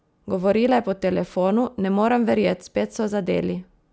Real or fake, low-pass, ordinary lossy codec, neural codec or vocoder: real; none; none; none